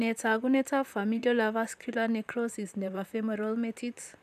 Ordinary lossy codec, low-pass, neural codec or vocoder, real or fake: none; 14.4 kHz; vocoder, 44.1 kHz, 128 mel bands, Pupu-Vocoder; fake